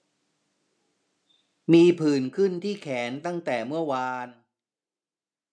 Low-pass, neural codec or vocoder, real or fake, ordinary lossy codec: none; none; real; none